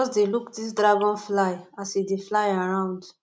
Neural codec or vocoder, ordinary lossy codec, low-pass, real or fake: none; none; none; real